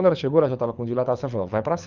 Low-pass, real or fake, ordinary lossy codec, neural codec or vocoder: 7.2 kHz; fake; none; codec, 24 kHz, 6 kbps, HILCodec